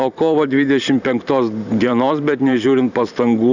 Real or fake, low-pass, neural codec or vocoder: fake; 7.2 kHz; vocoder, 44.1 kHz, 128 mel bands every 512 samples, BigVGAN v2